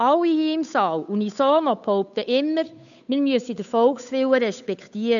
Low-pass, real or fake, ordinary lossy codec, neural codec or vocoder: 7.2 kHz; fake; none; codec, 16 kHz, 8 kbps, FunCodec, trained on Chinese and English, 25 frames a second